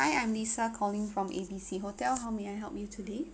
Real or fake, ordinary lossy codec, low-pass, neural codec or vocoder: real; none; none; none